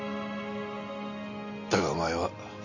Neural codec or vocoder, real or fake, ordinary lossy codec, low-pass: none; real; none; 7.2 kHz